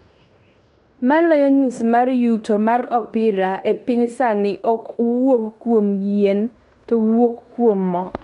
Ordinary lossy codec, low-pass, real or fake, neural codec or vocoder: none; 10.8 kHz; fake; codec, 16 kHz in and 24 kHz out, 0.9 kbps, LongCat-Audio-Codec, fine tuned four codebook decoder